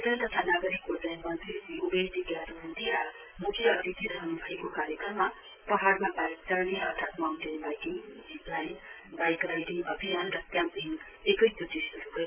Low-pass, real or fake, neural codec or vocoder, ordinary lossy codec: 3.6 kHz; fake; vocoder, 44.1 kHz, 128 mel bands, Pupu-Vocoder; none